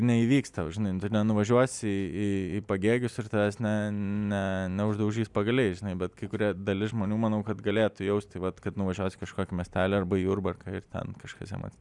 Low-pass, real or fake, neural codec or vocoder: 10.8 kHz; real; none